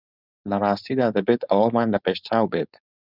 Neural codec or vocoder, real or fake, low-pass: codec, 16 kHz, 4.8 kbps, FACodec; fake; 5.4 kHz